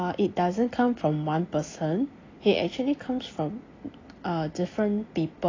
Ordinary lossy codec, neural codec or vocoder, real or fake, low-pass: AAC, 32 kbps; none; real; 7.2 kHz